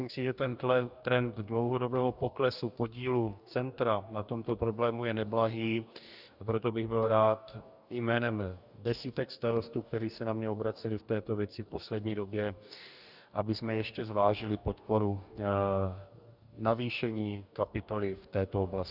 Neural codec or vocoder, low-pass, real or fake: codec, 44.1 kHz, 2.6 kbps, DAC; 5.4 kHz; fake